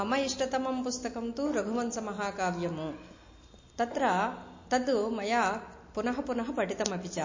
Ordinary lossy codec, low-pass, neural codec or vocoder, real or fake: MP3, 32 kbps; 7.2 kHz; none; real